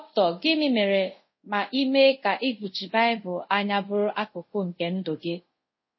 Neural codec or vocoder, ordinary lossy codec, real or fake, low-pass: codec, 24 kHz, 0.5 kbps, DualCodec; MP3, 24 kbps; fake; 7.2 kHz